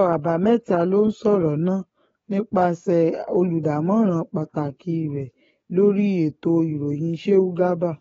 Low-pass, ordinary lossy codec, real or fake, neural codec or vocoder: 19.8 kHz; AAC, 24 kbps; fake; codec, 44.1 kHz, 7.8 kbps, DAC